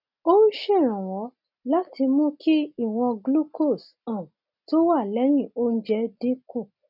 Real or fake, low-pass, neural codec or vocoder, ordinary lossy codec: real; 5.4 kHz; none; none